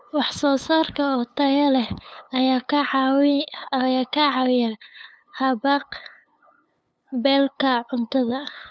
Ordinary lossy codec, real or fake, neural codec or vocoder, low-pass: none; fake; codec, 16 kHz, 8 kbps, FunCodec, trained on LibriTTS, 25 frames a second; none